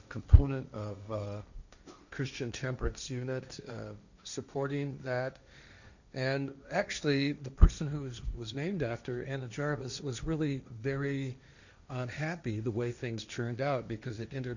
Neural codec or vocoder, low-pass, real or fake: codec, 16 kHz, 1.1 kbps, Voila-Tokenizer; 7.2 kHz; fake